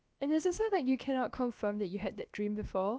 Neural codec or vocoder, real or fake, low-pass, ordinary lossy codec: codec, 16 kHz, about 1 kbps, DyCAST, with the encoder's durations; fake; none; none